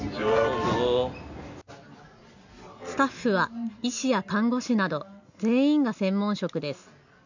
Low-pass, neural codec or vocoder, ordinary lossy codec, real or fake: 7.2 kHz; none; none; real